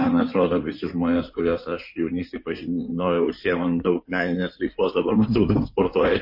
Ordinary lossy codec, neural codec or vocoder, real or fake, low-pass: MP3, 32 kbps; codec, 16 kHz in and 24 kHz out, 2.2 kbps, FireRedTTS-2 codec; fake; 5.4 kHz